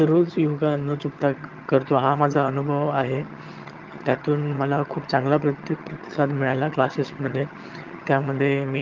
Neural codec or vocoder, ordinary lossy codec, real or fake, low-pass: vocoder, 22.05 kHz, 80 mel bands, HiFi-GAN; Opus, 24 kbps; fake; 7.2 kHz